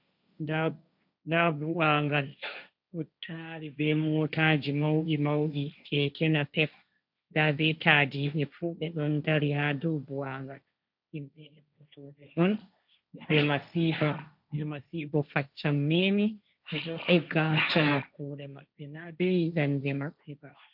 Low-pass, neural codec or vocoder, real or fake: 5.4 kHz; codec, 16 kHz, 1.1 kbps, Voila-Tokenizer; fake